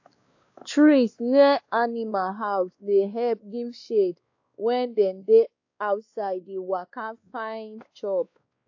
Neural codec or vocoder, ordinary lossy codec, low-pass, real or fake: codec, 16 kHz, 2 kbps, X-Codec, WavLM features, trained on Multilingual LibriSpeech; AAC, 48 kbps; 7.2 kHz; fake